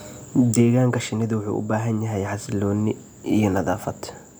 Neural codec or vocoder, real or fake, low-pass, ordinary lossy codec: none; real; none; none